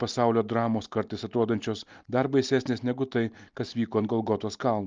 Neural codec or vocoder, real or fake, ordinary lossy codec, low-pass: none; real; Opus, 24 kbps; 7.2 kHz